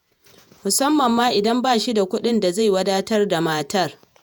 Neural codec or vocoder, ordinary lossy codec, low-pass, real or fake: vocoder, 48 kHz, 128 mel bands, Vocos; none; none; fake